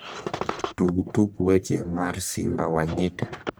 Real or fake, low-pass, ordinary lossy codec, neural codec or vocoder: fake; none; none; codec, 44.1 kHz, 1.7 kbps, Pupu-Codec